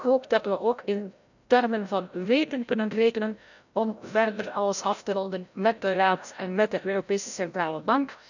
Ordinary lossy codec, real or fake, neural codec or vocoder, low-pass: none; fake; codec, 16 kHz, 0.5 kbps, FreqCodec, larger model; 7.2 kHz